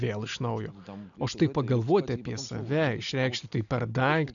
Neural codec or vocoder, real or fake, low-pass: none; real; 7.2 kHz